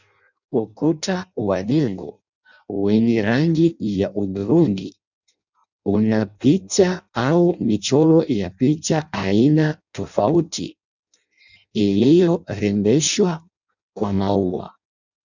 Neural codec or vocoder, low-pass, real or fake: codec, 16 kHz in and 24 kHz out, 0.6 kbps, FireRedTTS-2 codec; 7.2 kHz; fake